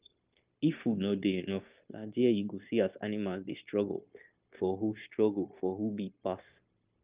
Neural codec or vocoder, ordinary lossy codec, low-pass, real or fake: codec, 16 kHz, 0.9 kbps, LongCat-Audio-Codec; Opus, 24 kbps; 3.6 kHz; fake